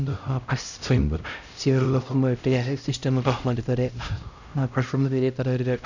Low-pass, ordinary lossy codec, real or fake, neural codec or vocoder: 7.2 kHz; none; fake; codec, 16 kHz, 0.5 kbps, X-Codec, HuBERT features, trained on LibriSpeech